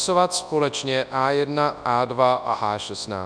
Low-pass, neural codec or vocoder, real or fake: 9.9 kHz; codec, 24 kHz, 0.9 kbps, WavTokenizer, large speech release; fake